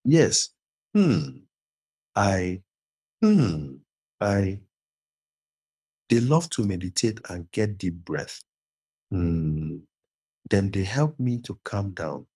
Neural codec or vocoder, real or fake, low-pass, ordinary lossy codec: codec, 24 kHz, 6 kbps, HILCodec; fake; none; none